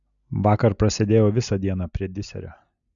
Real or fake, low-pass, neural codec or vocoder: real; 7.2 kHz; none